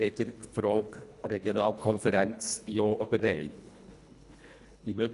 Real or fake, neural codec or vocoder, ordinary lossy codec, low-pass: fake; codec, 24 kHz, 1.5 kbps, HILCodec; none; 10.8 kHz